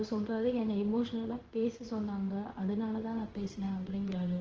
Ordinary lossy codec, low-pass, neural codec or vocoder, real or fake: Opus, 16 kbps; 7.2 kHz; codec, 16 kHz in and 24 kHz out, 1 kbps, XY-Tokenizer; fake